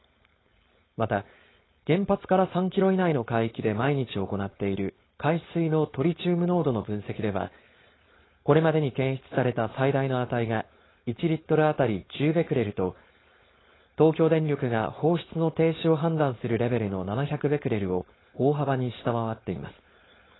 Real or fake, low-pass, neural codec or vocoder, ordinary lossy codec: fake; 7.2 kHz; codec, 16 kHz, 4.8 kbps, FACodec; AAC, 16 kbps